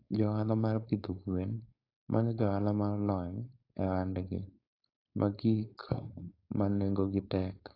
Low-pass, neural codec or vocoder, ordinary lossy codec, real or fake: 5.4 kHz; codec, 16 kHz, 4.8 kbps, FACodec; AAC, 32 kbps; fake